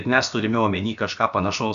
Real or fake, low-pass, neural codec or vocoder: fake; 7.2 kHz; codec, 16 kHz, about 1 kbps, DyCAST, with the encoder's durations